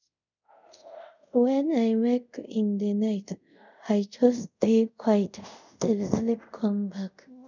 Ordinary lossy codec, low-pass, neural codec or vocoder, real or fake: none; 7.2 kHz; codec, 24 kHz, 0.5 kbps, DualCodec; fake